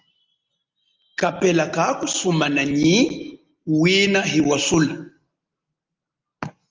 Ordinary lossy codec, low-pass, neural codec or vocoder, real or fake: Opus, 32 kbps; 7.2 kHz; none; real